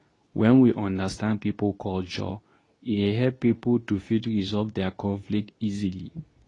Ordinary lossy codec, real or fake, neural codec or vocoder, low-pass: AAC, 32 kbps; fake; codec, 24 kHz, 0.9 kbps, WavTokenizer, medium speech release version 2; 10.8 kHz